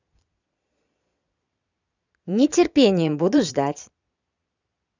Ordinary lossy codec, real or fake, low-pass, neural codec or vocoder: none; fake; 7.2 kHz; vocoder, 22.05 kHz, 80 mel bands, Vocos